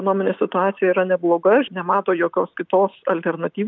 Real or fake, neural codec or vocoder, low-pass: real; none; 7.2 kHz